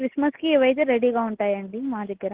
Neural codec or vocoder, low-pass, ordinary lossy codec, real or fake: none; 3.6 kHz; Opus, 16 kbps; real